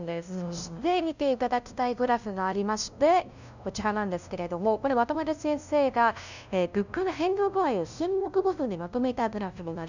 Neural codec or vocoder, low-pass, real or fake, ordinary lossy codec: codec, 16 kHz, 0.5 kbps, FunCodec, trained on LibriTTS, 25 frames a second; 7.2 kHz; fake; none